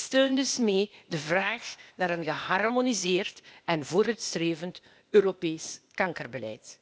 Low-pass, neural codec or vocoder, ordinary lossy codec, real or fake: none; codec, 16 kHz, 0.8 kbps, ZipCodec; none; fake